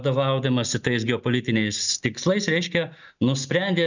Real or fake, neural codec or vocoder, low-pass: real; none; 7.2 kHz